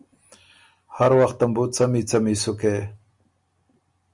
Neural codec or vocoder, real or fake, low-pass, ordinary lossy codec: none; real; 10.8 kHz; Opus, 64 kbps